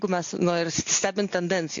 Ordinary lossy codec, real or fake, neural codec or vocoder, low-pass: AAC, 48 kbps; real; none; 7.2 kHz